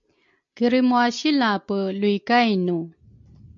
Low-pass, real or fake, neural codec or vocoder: 7.2 kHz; real; none